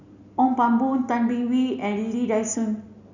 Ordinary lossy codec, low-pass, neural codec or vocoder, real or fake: none; 7.2 kHz; none; real